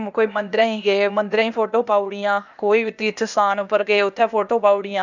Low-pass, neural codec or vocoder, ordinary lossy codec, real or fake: 7.2 kHz; codec, 16 kHz, 0.8 kbps, ZipCodec; none; fake